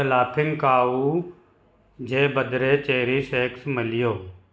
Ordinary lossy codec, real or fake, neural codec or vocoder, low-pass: none; real; none; none